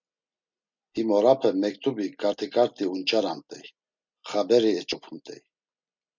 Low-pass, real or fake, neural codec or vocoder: 7.2 kHz; real; none